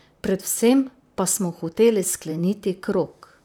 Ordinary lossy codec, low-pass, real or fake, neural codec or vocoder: none; none; fake; vocoder, 44.1 kHz, 128 mel bands, Pupu-Vocoder